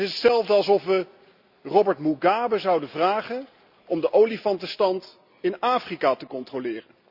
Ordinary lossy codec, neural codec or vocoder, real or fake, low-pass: Opus, 64 kbps; none; real; 5.4 kHz